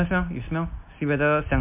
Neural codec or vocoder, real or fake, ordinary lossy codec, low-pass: none; real; MP3, 32 kbps; 3.6 kHz